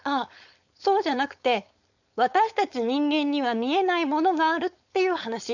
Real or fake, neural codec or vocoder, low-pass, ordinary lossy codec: fake; codec, 16 kHz, 4.8 kbps, FACodec; 7.2 kHz; none